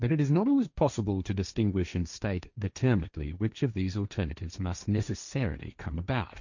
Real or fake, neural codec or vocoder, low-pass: fake; codec, 16 kHz, 1.1 kbps, Voila-Tokenizer; 7.2 kHz